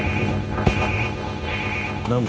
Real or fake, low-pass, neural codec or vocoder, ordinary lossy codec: fake; none; codec, 16 kHz, 0.9 kbps, LongCat-Audio-Codec; none